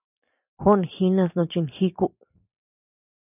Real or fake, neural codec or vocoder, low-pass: fake; vocoder, 44.1 kHz, 128 mel bands every 256 samples, BigVGAN v2; 3.6 kHz